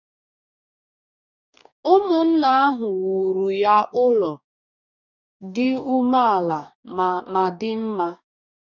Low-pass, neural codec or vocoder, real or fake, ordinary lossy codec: 7.2 kHz; codec, 44.1 kHz, 2.6 kbps, DAC; fake; none